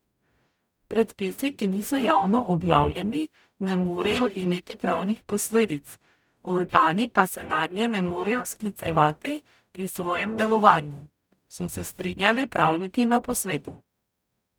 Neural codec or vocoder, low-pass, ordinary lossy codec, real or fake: codec, 44.1 kHz, 0.9 kbps, DAC; none; none; fake